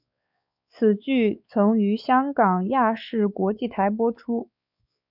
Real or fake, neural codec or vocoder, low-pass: fake; codec, 16 kHz, 4 kbps, X-Codec, WavLM features, trained on Multilingual LibriSpeech; 5.4 kHz